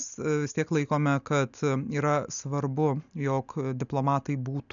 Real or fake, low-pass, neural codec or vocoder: real; 7.2 kHz; none